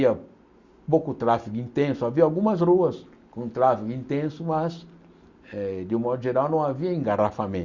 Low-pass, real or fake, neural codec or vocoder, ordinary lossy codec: 7.2 kHz; real; none; Opus, 64 kbps